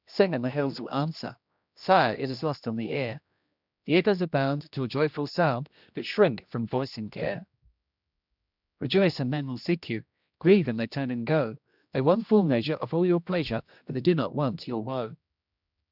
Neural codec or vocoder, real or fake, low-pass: codec, 16 kHz, 1 kbps, X-Codec, HuBERT features, trained on general audio; fake; 5.4 kHz